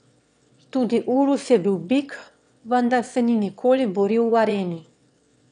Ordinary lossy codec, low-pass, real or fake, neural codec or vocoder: none; 9.9 kHz; fake; autoencoder, 22.05 kHz, a latent of 192 numbers a frame, VITS, trained on one speaker